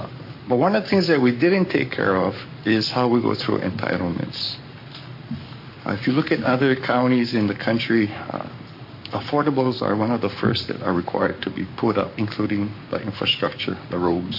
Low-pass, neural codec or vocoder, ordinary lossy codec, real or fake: 5.4 kHz; codec, 44.1 kHz, 7.8 kbps, Pupu-Codec; MP3, 32 kbps; fake